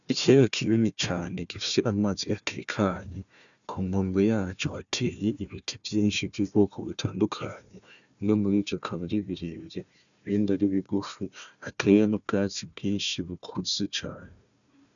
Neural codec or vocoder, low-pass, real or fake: codec, 16 kHz, 1 kbps, FunCodec, trained on Chinese and English, 50 frames a second; 7.2 kHz; fake